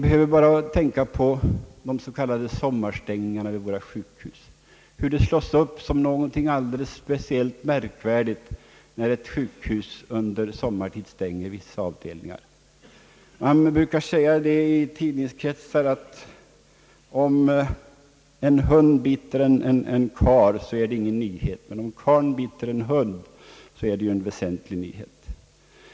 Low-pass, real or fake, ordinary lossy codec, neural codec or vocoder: none; real; none; none